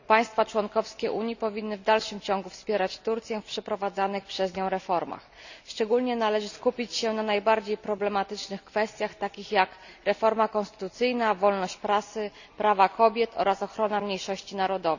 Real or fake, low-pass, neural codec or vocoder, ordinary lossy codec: real; 7.2 kHz; none; none